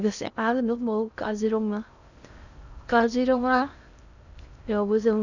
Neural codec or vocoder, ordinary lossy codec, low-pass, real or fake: codec, 16 kHz in and 24 kHz out, 0.6 kbps, FocalCodec, streaming, 2048 codes; none; 7.2 kHz; fake